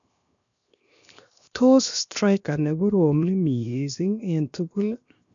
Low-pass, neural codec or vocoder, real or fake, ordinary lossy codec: 7.2 kHz; codec, 16 kHz, 0.7 kbps, FocalCodec; fake; none